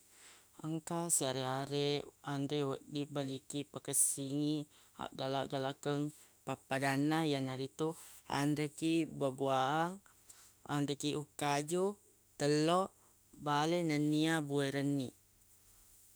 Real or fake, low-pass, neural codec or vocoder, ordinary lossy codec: fake; none; autoencoder, 48 kHz, 32 numbers a frame, DAC-VAE, trained on Japanese speech; none